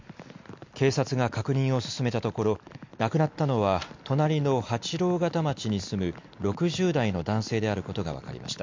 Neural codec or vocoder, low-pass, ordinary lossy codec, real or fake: none; 7.2 kHz; MP3, 48 kbps; real